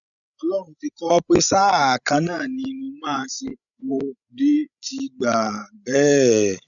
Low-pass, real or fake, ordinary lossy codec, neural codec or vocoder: 7.2 kHz; fake; none; codec, 16 kHz, 16 kbps, FreqCodec, larger model